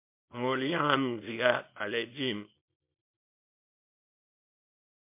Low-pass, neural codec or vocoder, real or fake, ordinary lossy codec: 3.6 kHz; codec, 24 kHz, 0.9 kbps, WavTokenizer, small release; fake; MP3, 32 kbps